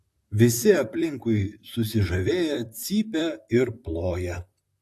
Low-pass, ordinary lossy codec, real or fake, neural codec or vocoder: 14.4 kHz; AAC, 64 kbps; fake; vocoder, 44.1 kHz, 128 mel bands, Pupu-Vocoder